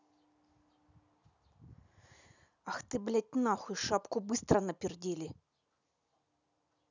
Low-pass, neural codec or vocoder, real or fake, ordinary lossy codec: 7.2 kHz; none; real; none